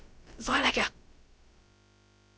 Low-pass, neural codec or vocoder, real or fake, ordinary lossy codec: none; codec, 16 kHz, about 1 kbps, DyCAST, with the encoder's durations; fake; none